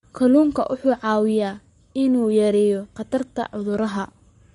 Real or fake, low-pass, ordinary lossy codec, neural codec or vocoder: fake; 19.8 kHz; MP3, 48 kbps; codec, 44.1 kHz, 7.8 kbps, Pupu-Codec